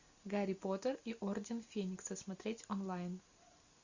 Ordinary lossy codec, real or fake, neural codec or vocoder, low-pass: Opus, 64 kbps; real; none; 7.2 kHz